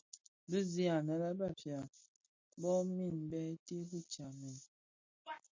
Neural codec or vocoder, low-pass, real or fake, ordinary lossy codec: none; 7.2 kHz; real; MP3, 32 kbps